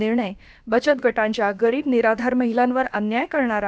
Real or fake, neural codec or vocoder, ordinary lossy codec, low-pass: fake; codec, 16 kHz, about 1 kbps, DyCAST, with the encoder's durations; none; none